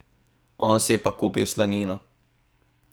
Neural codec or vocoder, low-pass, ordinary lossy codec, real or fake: codec, 44.1 kHz, 2.6 kbps, SNAC; none; none; fake